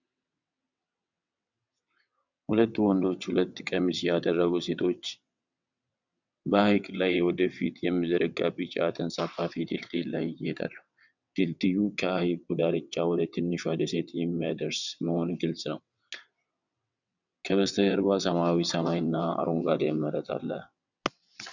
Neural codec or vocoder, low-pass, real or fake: vocoder, 22.05 kHz, 80 mel bands, WaveNeXt; 7.2 kHz; fake